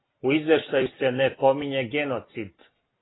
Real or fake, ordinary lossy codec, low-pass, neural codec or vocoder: real; AAC, 16 kbps; 7.2 kHz; none